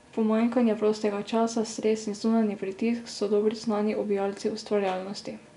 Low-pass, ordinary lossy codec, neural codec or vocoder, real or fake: 10.8 kHz; none; none; real